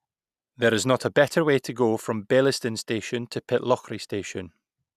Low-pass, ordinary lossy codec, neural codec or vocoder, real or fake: 14.4 kHz; none; none; real